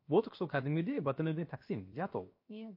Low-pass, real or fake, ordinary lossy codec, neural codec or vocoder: 5.4 kHz; fake; MP3, 32 kbps; codec, 16 kHz, 0.7 kbps, FocalCodec